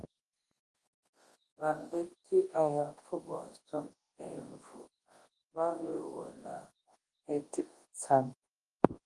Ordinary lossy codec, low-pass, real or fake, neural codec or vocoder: Opus, 24 kbps; 10.8 kHz; fake; codec, 24 kHz, 0.9 kbps, WavTokenizer, large speech release